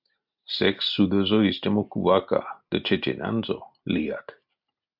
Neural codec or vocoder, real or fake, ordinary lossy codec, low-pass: none; real; MP3, 48 kbps; 5.4 kHz